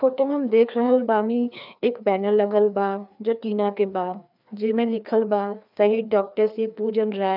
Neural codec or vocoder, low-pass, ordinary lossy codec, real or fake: codec, 16 kHz, 2 kbps, FreqCodec, larger model; 5.4 kHz; none; fake